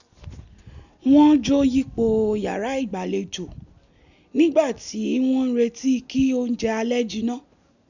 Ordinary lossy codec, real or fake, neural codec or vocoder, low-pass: none; real; none; 7.2 kHz